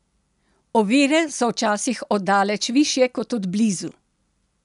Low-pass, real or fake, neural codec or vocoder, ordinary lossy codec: 10.8 kHz; real; none; none